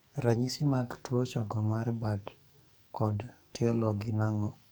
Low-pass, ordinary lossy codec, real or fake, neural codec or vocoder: none; none; fake; codec, 44.1 kHz, 2.6 kbps, SNAC